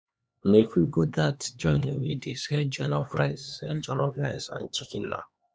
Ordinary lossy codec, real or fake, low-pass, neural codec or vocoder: none; fake; none; codec, 16 kHz, 2 kbps, X-Codec, HuBERT features, trained on LibriSpeech